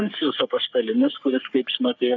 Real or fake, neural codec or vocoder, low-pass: fake; codec, 44.1 kHz, 3.4 kbps, Pupu-Codec; 7.2 kHz